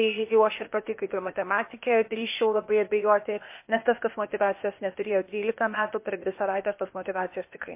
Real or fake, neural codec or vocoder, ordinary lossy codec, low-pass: fake; codec, 16 kHz, 0.8 kbps, ZipCodec; MP3, 24 kbps; 3.6 kHz